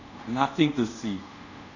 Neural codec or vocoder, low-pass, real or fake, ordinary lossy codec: codec, 24 kHz, 0.5 kbps, DualCodec; 7.2 kHz; fake; none